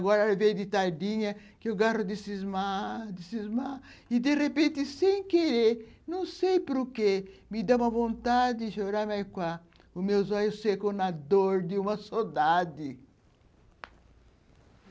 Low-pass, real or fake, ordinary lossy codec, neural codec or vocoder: none; real; none; none